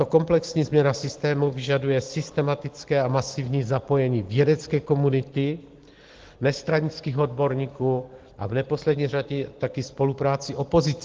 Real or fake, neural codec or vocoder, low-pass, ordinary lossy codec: real; none; 7.2 kHz; Opus, 16 kbps